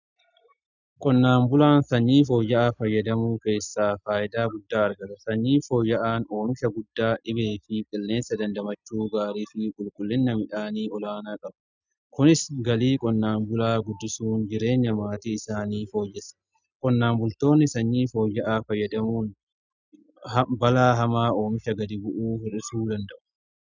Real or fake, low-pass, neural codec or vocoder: real; 7.2 kHz; none